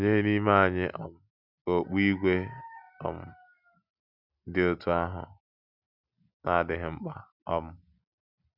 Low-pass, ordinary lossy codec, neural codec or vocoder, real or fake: 5.4 kHz; none; none; real